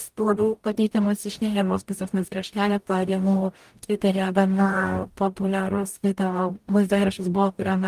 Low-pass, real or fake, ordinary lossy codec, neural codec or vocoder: 14.4 kHz; fake; Opus, 32 kbps; codec, 44.1 kHz, 0.9 kbps, DAC